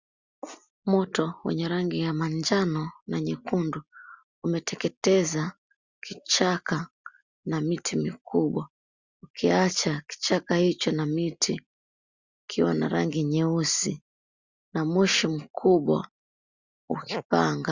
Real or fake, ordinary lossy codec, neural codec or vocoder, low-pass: real; Opus, 64 kbps; none; 7.2 kHz